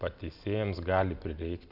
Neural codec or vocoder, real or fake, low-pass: none; real; 5.4 kHz